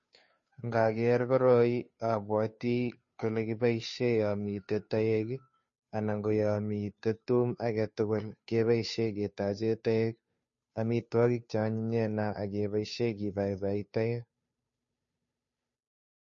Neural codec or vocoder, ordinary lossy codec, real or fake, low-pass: codec, 16 kHz, 2 kbps, FunCodec, trained on Chinese and English, 25 frames a second; MP3, 32 kbps; fake; 7.2 kHz